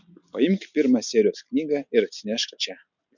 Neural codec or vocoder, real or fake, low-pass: none; real; 7.2 kHz